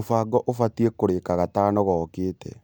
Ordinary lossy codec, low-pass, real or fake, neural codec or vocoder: none; none; real; none